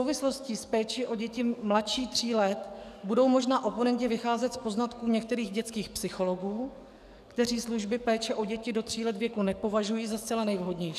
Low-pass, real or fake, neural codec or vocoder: 14.4 kHz; fake; codec, 44.1 kHz, 7.8 kbps, DAC